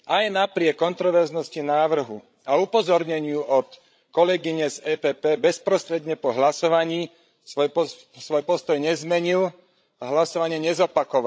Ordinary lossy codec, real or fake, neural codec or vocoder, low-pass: none; fake; codec, 16 kHz, 16 kbps, FreqCodec, larger model; none